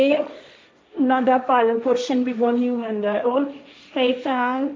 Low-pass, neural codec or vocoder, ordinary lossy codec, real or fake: none; codec, 16 kHz, 1.1 kbps, Voila-Tokenizer; none; fake